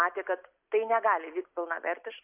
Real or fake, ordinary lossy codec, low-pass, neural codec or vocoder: real; AAC, 32 kbps; 3.6 kHz; none